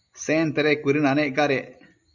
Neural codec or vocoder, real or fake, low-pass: none; real; 7.2 kHz